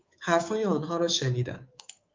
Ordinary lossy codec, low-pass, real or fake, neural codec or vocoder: Opus, 32 kbps; 7.2 kHz; fake; codec, 24 kHz, 3.1 kbps, DualCodec